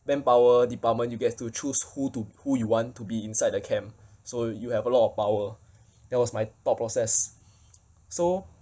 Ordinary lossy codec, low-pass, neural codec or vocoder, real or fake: none; none; none; real